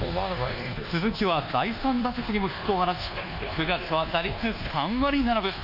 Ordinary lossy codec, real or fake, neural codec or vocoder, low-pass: none; fake; codec, 24 kHz, 1.2 kbps, DualCodec; 5.4 kHz